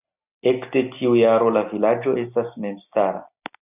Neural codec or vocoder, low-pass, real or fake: none; 3.6 kHz; real